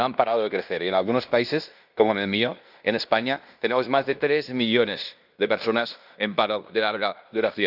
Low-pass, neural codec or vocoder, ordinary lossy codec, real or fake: 5.4 kHz; codec, 16 kHz in and 24 kHz out, 0.9 kbps, LongCat-Audio-Codec, fine tuned four codebook decoder; none; fake